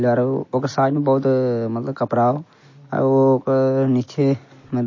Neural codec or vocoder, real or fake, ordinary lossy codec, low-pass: none; real; MP3, 32 kbps; 7.2 kHz